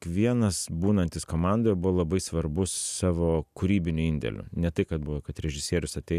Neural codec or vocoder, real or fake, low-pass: none; real; 14.4 kHz